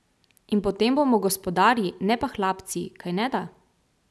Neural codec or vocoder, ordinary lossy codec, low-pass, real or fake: none; none; none; real